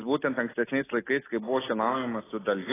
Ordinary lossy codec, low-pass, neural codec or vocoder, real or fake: AAC, 16 kbps; 3.6 kHz; none; real